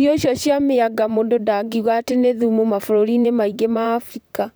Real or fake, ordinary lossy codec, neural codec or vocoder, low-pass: fake; none; vocoder, 44.1 kHz, 128 mel bands, Pupu-Vocoder; none